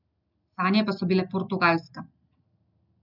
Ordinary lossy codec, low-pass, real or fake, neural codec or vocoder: none; 5.4 kHz; real; none